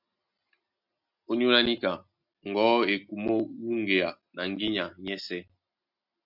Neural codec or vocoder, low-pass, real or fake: none; 5.4 kHz; real